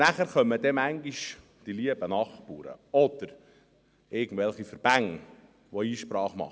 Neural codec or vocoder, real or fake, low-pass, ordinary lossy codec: none; real; none; none